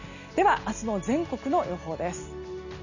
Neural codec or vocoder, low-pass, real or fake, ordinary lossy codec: none; 7.2 kHz; real; none